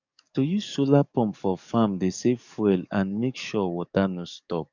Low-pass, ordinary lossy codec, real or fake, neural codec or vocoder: 7.2 kHz; none; fake; codec, 44.1 kHz, 7.8 kbps, DAC